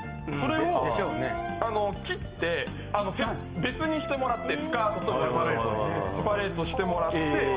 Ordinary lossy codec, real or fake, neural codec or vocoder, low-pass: Opus, 24 kbps; real; none; 3.6 kHz